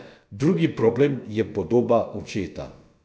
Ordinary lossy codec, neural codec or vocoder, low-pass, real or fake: none; codec, 16 kHz, about 1 kbps, DyCAST, with the encoder's durations; none; fake